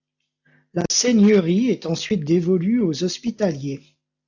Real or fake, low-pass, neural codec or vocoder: real; 7.2 kHz; none